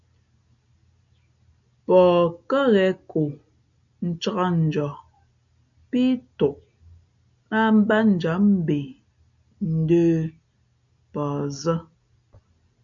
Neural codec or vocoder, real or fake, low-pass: none; real; 7.2 kHz